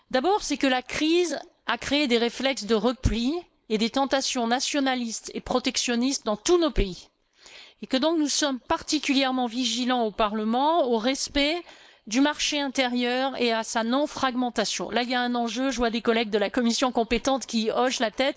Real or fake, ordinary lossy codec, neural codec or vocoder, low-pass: fake; none; codec, 16 kHz, 4.8 kbps, FACodec; none